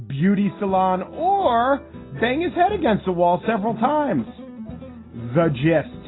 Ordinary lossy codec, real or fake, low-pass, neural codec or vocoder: AAC, 16 kbps; real; 7.2 kHz; none